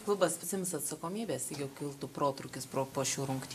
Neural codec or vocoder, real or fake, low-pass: none; real; 14.4 kHz